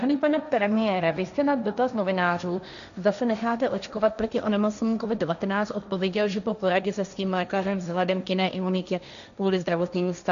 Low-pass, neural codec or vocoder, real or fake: 7.2 kHz; codec, 16 kHz, 1.1 kbps, Voila-Tokenizer; fake